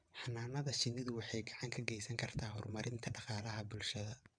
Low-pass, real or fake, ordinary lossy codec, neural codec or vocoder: 9.9 kHz; fake; none; vocoder, 22.05 kHz, 80 mel bands, WaveNeXt